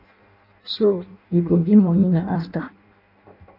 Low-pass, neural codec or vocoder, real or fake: 5.4 kHz; codec, 16 kHz in and 24 kHz out, 0.6 kbps, FireRedTTS-2 codec; fake